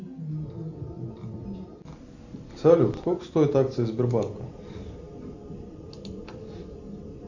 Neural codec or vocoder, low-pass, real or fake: none; 7.2 kHz; real